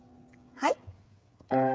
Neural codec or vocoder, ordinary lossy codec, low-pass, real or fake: codec, 16 kHz, 8 kbps, FreqCodec, smaller model; none; none; fake